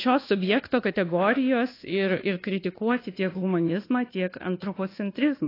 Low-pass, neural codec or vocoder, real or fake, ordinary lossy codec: 5.4 kHz; codec, 24 kHz, 1.2 kbps, DualCodec; fake; AAC, 24 kbps